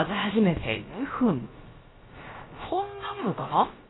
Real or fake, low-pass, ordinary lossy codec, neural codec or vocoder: fake; 7.2 kHz; AAC, 16 kbps; codec, 16 kHz, about 1 kbps, DyCAST, with the encoder's durations